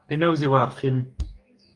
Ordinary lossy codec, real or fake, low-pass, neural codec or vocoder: Opus, 32 kbps; fake; 10.8 kHz; codec, 44.1 kHz, 2.6 kbps, DAC